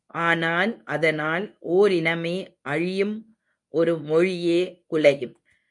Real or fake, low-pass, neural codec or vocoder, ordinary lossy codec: fake; 10.8 kHz; codec, 24 kHz, 0.9 kbps, WavTokenizer, medium speech release version 1; MP3, 64 kbps